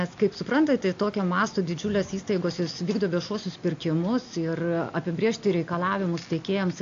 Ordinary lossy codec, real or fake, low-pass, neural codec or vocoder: AAC, 48 kbps; real; 7.2 kHz; none